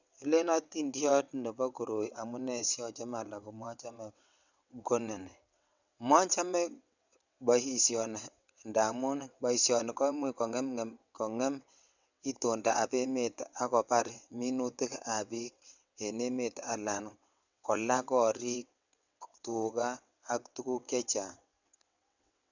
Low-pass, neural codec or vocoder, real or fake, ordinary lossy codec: 7.2 kHz; vocoder, 22.05 kHz, 80 mel bands, WaveNeXt; fake; none